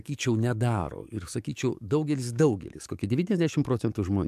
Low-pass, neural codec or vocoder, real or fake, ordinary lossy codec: 14.4 kHz; codec, 44.1 kHz, 7.8 kbps, DAC; fake; MP3, 96 kbps